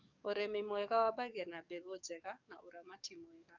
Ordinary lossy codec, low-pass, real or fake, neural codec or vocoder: none; 7.2 kHz; fake; codec, 44.1 kHz, 7.8 kbps, DAC